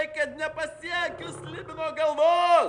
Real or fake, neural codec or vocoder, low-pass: real; none; 9.9 kHz